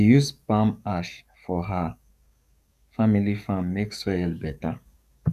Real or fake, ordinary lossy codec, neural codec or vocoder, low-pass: fake; none; codec, 44.1 kHz, 7.8 kbps, DAC; 14.4 kHz